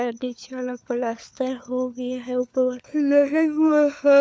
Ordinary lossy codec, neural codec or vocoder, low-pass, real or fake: none; codec, 16 kHz, 4 kbps, FunCodec, trained on LibriTTS, 50 frames a second; none; fake